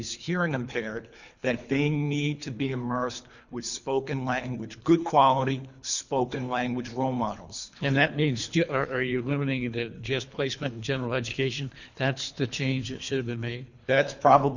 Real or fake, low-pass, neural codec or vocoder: fake; 7.2 kHz; codec, 24 kHz, 3 kbps, HILCodec